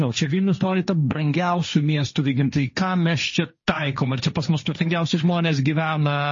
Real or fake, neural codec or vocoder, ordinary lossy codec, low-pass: fake; codec, 16 kHz, 1.1 kbps, Voila-Tokenizer; MP3, 32 kbps; 7.2 kHz